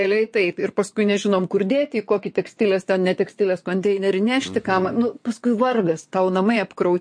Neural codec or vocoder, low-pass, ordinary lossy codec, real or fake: vocoder, 22.05 kHz, 80 mel bands, WaveNeXt; 9.9 kHz; MP3, 48 kbps; fake